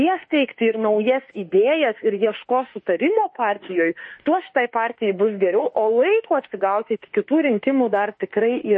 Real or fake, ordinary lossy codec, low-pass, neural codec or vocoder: fake; MP3, 32 kbps; 10.8 kHz; autoencoder, 48 kHz, 32 numbers a frame, DAC-VAE, trained on Japanese speech